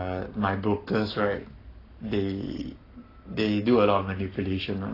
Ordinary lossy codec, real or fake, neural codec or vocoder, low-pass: AAC, 24 kbps; fake; codec, 44.1 kHz, 7.8 kbps, Pupu-Codec; 5.4 kHz